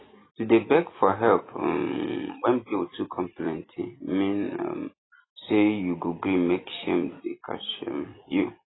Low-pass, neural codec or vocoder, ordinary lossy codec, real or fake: 7.2 kHz; vocoder, 44.1 kHz, 128 mel bands every 256 samples, BigVGAN v2; AAC, 16 kbps; fake